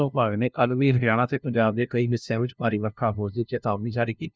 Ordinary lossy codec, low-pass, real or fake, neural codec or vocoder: none; none; fake; codec, 16 kHz, 0.5 kbps, FunCodec, trained on LibriTTS, 25 frames a second